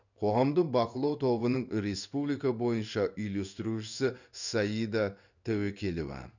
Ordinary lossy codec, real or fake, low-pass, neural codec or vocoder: none; fake; 7.2 kHz; codec, 16 kHz in and 24 kHz out, 1 kbps, XY-Tokenizer